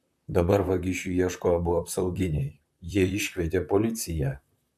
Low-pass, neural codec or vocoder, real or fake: 14.4 kHz; vocoder, 44.1 kHz, 128 mel bands, Pupu-Vocoder; fake